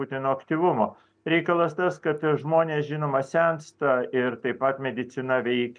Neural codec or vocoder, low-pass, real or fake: none; 9.9 kHz; real